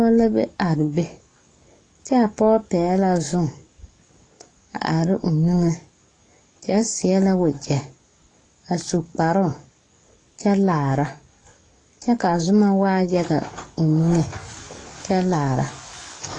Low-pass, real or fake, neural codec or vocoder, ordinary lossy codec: 9.9 kHz; fake; codec, 44.1 kHz, 7.8 kbps, DAC; AAC, 32 kbps